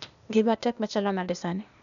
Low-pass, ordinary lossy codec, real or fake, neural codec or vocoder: 7.2 kHz; none; fake; codec, 16 kHz, 0.8 kbps, ZipCodec